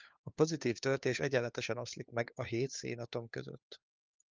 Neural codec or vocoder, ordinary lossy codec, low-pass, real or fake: codec, 16 kHz, 4 kbps, FunCodec, trained on LibriTTS, 50 frames a second; Opus, 24 kbps; 7.2 kHz; fake